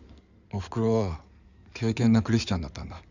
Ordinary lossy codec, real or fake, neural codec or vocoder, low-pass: none; fake; codec, 16 kHz in and 24 kHz out, 2.2 kbps, FireRedTTS-2 codec; 7.2 kHz